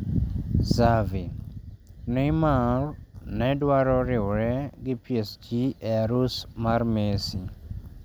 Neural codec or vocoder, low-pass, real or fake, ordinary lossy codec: none; none; real; none